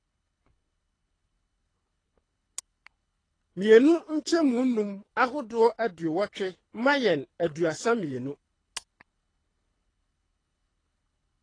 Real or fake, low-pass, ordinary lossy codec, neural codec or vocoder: fake; 9.9 kHz; AAC, 32 kbps; codec, 24 kHz, 3 kbps, HILCodec